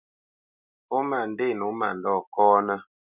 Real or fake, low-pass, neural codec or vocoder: real; 3.6 kHz; none